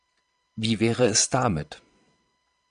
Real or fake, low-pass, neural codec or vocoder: fake; 9.9 kHz; vocoder, 22.05 kHz, 80 mel bands, Vocos